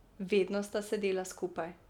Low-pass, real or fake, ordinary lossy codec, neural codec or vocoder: 19.8 kHz; real; none; none